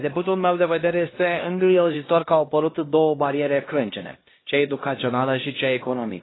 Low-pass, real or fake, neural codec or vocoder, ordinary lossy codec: 7.2 kHz; fake; codec, 16 kHz, 1 kbps, X-Codec, HuBERT features, trained on LibriSpeech; AAC, 16 kbps